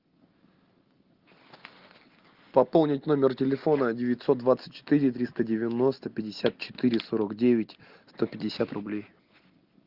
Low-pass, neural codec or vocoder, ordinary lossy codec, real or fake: 5.4 kHz; none; Opus, 32 kbps; real